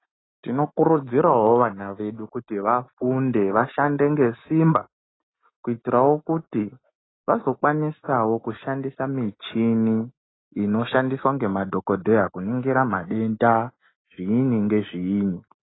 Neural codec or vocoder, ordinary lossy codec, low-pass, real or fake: none; AAC, 16 kbps; 7.2 kHz; real